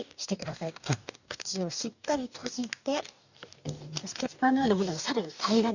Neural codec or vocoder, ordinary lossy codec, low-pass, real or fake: codec, 44.1 kHz, 2.6 kbps, DAC; none; 7.2 kHz; fake